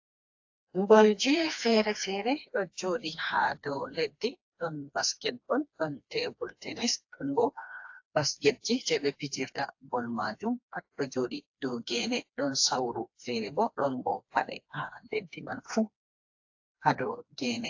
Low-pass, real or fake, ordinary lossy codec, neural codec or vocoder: 7.2 kHz; fake; AAC, 48 kbps; codec, 16 kHz, 2 kbps, FreqCodec, smaller model